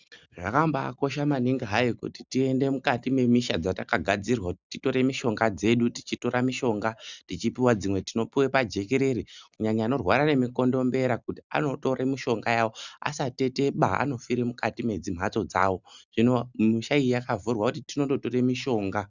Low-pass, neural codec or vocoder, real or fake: 7.2 kHz; none; real